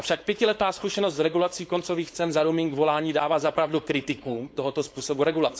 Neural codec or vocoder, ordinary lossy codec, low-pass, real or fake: codec, 16 kHz, 4.8 kbps, FACodec; none; none; fake